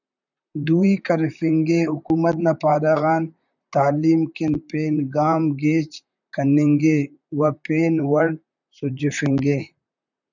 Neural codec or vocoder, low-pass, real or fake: vocoder, 44.1 kHz, 128 mel bands, Pupu-Vocoder; 7.2 kHz; fake